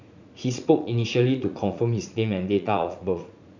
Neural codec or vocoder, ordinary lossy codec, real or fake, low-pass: vocoder, 44.1 kHz, 80 mel bands, Vocos; none; fake; 7.2 kHz